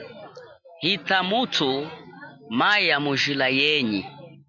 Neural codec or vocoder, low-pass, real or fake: none; 7.2 kHz; real